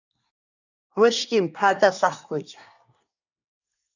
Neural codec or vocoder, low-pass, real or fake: codec, 24 kHz, 1 kbps, SNAC; 7.2 kHz; fake